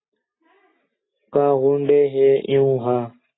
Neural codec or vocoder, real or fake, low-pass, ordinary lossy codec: none; real; 7.2 kHz; AAC, 16 kbps